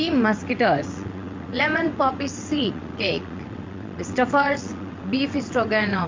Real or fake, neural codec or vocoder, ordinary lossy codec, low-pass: fake; vocoder, 22.05 kHz, 80 mel bands, WaveNeXt; MP3, 48 kbps; 7.2 kHz